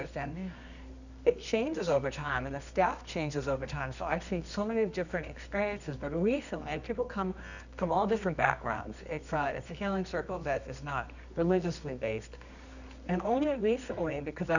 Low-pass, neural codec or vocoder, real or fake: 7.2 kHz; codec, 24 kHz, 0.9 kbps, WavTokenizer, medium music audio release; fake